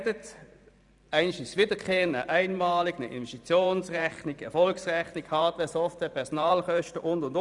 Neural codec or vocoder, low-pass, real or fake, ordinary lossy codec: vocoder, 44.1 kHz, 128 mel bands every 512 samples, BigVGAN v2; 10.8 kHz; fake; none